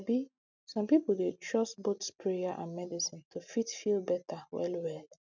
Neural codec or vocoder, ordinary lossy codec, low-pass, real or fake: none; none; 7.2 kHz; real